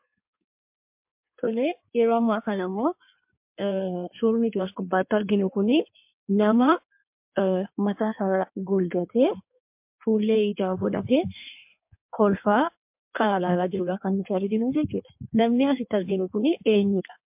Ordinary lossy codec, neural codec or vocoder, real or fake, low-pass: MP3, 32 kbps; codec, 16 kHz in and 24 kHz out, 1.1 kbps, FireRedTTS-2 codec; fake; 3.6 kHz